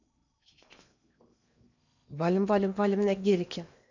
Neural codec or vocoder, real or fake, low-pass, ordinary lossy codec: codec, 16 kHz in and 24 kHz out, 0.8 kbps, FocalCodec, streaming, 65536 codes; fake; 7.2 kHz; none